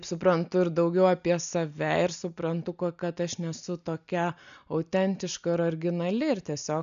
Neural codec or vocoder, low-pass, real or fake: none; 7.2 kHz; real